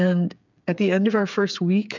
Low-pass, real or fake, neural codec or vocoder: 7.2 kHz; fake; codec, 16 kHz, 8 kbps, FreqCodec, smaller model